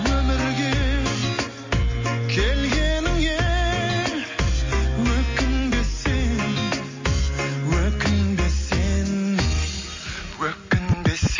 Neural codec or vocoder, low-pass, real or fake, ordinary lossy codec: none; 7.2 kHz; real; MP3, 32 kbps